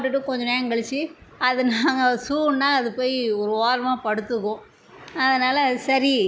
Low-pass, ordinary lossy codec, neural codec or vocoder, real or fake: none; none; none; real